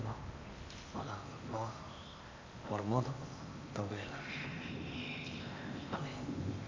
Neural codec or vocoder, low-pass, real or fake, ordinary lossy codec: codec, 16 kHz in and 24 kHz out, 0.8 kbps, FocalCodec, streaming, 65536 codes; 7.2 kHz; fake; MP3, 48 kbps